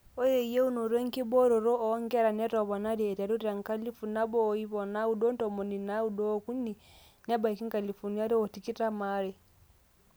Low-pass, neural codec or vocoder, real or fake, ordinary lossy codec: none; none; real; none